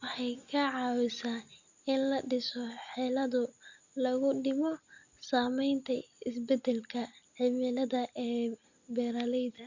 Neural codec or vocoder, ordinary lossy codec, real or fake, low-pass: none; Opus, 64 kbps; real; 7.2 kHz